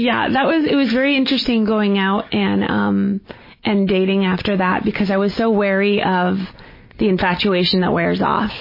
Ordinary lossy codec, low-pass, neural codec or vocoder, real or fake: MP3, 24 kbps; 5.4 kHz; none; real